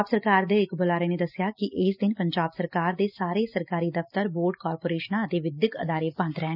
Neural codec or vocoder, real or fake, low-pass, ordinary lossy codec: none; real; 5.4 kHz; none